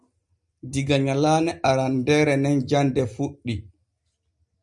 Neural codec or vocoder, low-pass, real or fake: none; 10.8 kHz; real